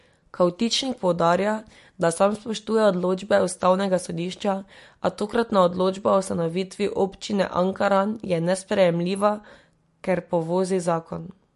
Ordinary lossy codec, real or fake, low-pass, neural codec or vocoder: MP3, 48 kbps; real; 14.4 kHz; none